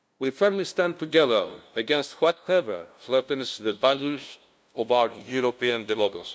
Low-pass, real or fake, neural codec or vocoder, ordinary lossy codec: none; fake; codec, 16 kHz, 0.5 kbps, FunCodec, trained on LibriTTS, 25 frames a second; none